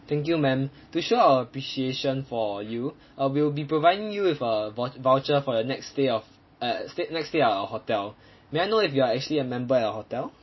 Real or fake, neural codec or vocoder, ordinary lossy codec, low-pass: real; none; MP3, 24 kbps; 7.2 kHz